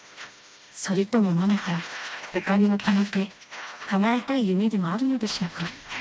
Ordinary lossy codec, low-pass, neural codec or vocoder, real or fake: none; none; codec, 16 kHz, 1 kbps, FreqCodec, smaller model; fake